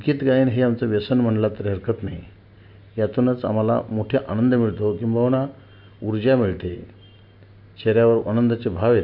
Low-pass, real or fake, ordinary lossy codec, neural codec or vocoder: 5.4 kHz; real; MP3, 48 kbps; none